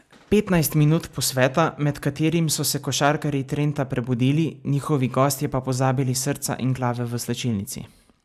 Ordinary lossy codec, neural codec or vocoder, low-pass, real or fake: none; none; 14.4 kHz; real